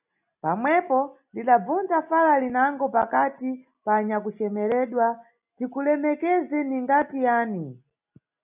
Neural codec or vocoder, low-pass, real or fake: none; 3.6 kHz; real